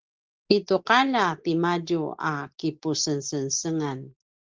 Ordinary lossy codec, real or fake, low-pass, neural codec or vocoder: Opus, 16 kbps; real; 7.2 kHz; none